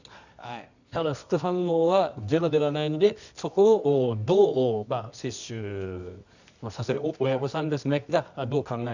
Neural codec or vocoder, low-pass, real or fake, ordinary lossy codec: codec, 24 kHz, 0.9 kbps, WavTokenizer, medium music audio release; 7.2 kHz; fake; none